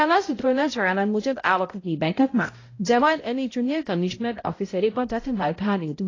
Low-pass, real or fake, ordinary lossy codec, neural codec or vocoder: 7.2 kHz; fake; AAC, 32 kbps; codec, 16 kHz, 0.5 kbps, X-Codec, HuBERT features, trained on balanced general audio